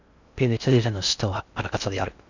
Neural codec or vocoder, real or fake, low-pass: codec, 16 kHz in and 24 kHz out, 0.6 kbps, FocalCodec, streaming, 2048 codes; fake; 7.2 kHz